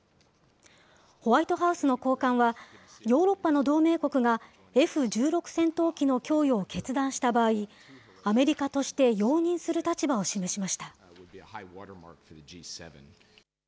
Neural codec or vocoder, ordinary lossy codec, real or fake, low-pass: none; none; real; none